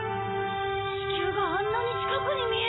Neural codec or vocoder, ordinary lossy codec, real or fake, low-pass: none; AAC, 16 kbps; real; 7.2 kHz